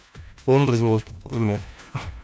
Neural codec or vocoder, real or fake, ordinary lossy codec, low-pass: codec, 16 kHz, 1 kbps, FunCodec, trained on LibriTTS, 50 frames a second; fake; none; none